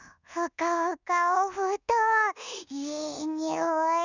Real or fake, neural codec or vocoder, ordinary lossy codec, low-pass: fake; codec, 24 kHz, 1.2 kbps, DualCodec; none; 7.2 kHz